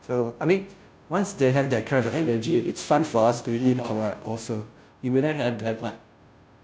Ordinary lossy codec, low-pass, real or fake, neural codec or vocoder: none; none; fake; codec, 16 kHz, 0.5 kbps, FunCodec, trained on Chinese and English, 25 frames a second